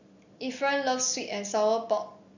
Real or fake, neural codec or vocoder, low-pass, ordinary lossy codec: real; none; 7.2 kHz; none